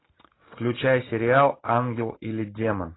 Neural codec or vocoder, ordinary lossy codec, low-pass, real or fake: none; AAC, 16 kbps; 7.2 kHz; real